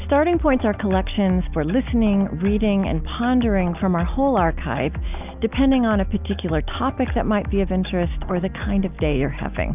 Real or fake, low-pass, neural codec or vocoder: real; 3.6 kHz; none